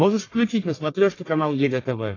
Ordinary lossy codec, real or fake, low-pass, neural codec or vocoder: AAC, 32 kbps; fake; 7.2 kHz; codec, 44.1 kHz, 1.7 kbps, Pupu-Codec